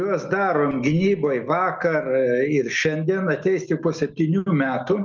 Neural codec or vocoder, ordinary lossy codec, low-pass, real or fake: none; Opus, 24 kbps; 7.2 kHz; real